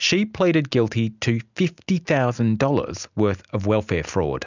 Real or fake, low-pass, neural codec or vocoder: real; 7.2 kHz; none